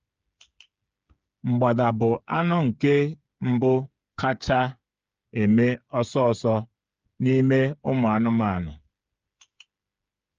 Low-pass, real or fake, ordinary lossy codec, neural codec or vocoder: 7.2 kHz; fake; Opus, 24 kbps; codec, 16 kHz, 8 kbps, FreqCodec, smaller model